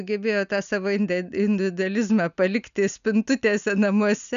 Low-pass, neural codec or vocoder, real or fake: 7.2 kHz; none; real